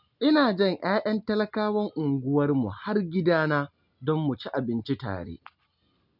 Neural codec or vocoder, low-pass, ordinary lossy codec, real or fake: none; 5.4 kHz; none; real